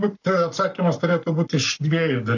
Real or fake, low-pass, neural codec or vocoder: fake; 7.2 kHz; codec, 44.1 kHz, 7.8 kbps, Pupu-Codec